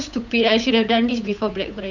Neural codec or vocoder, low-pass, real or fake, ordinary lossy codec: codec, 16 kHz, 8 kbps, FunCodec, trained on Chinese and English, 25 frames a second; 7.2 kHz; fake; none